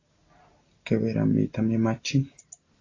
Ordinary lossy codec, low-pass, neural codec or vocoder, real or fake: AAC, 32 kbps; 7.2 kHz; none; real